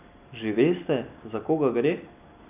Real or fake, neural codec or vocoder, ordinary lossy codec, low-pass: real; none; none; 3.6 kHz